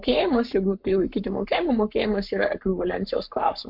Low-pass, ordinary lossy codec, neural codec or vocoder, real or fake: 5.4 kHz; MP3, 48 kbps; codec, 44.1 kHz, 7.8 kbps, Pupu-Codec; fake